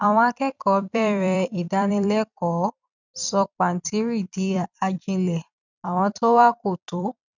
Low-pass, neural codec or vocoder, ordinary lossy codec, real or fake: 7.2 kHz; vocoder, 44.1 kHz, 128 mel bands every 256 samples, BigVGAN v2; none; fake